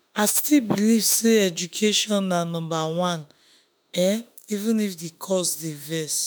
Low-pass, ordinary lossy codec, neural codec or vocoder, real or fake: none; none; autoencoder, 48 kHz, 32 numbers a frame, DAC-VAE, trained on Japanese speech; fake